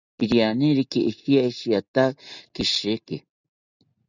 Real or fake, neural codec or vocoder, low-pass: real; none; 7.2 kHz